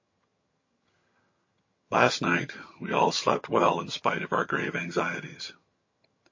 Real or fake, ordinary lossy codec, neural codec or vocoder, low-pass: fake; MP3, 32 kbps; vocoder, 22.05 kHz, 80 mel bands, HiFi-GAN; 7.2 kHz